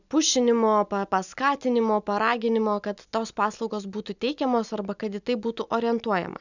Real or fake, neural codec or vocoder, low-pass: real; none; 7.2 kHz